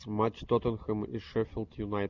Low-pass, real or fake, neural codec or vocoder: 7.2 kHz; real; none